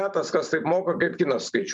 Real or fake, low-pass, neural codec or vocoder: fake; 10.8 kHz; vocoder, 44.1 kHz, 128 mel bands every 512 samples, BigVGAN v2